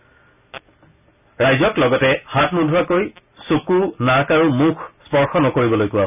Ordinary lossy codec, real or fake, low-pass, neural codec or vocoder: none; real; 3.6 kHz; none